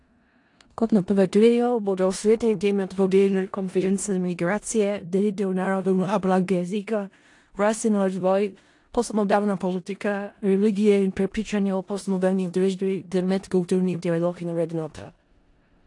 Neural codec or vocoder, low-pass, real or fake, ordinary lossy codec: codec, 16 kHz in and 24 kHz out, 0.4 kbps, LongCat-Audio-Codec, four codebook decoder; 10.8 kHz; fake; AAC, 48 kbps